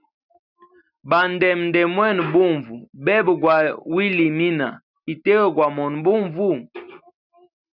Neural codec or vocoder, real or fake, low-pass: none; real; 5.4 kHz